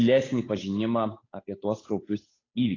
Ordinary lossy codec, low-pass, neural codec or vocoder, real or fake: AAC, 32 kbps; 7.2 kHz; codec, 16 kHz, 8 kbps, FunCodec, trained on Chinese and English, 25 frames a second; fake